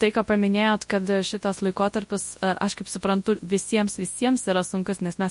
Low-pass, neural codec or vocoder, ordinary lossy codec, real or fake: 10.8 kHz; codec, 24 kHz, 0.9 kbps, WavTokenizer, large speech release; MP3, 48 kbps; fake